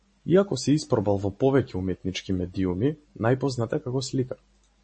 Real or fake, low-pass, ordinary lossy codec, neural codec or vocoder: real; 9.9 kHz; MP3, 32 kbps; none